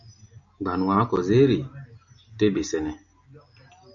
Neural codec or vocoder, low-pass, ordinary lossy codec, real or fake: none; 7.2 kHz; MP3, 96 kbps; real